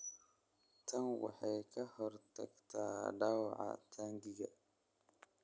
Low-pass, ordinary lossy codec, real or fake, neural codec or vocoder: none; none; real; none